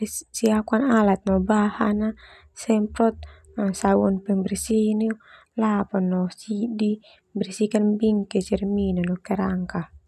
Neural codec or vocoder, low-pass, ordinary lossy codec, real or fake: none; 14.4 kHz; none; real